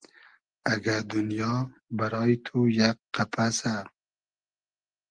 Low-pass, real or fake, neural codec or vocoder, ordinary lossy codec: 9.9 kHz; real; none; Opus, 24 kbps